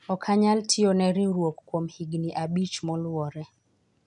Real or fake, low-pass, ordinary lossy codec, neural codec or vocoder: real; 10.8 kHz; none; none